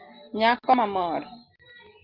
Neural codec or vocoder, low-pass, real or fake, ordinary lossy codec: none; 5.4 kHz; real; Opus, 24 kbps